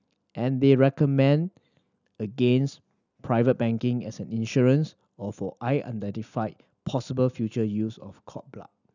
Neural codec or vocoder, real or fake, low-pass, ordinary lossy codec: none; real; 7.2 kHz; none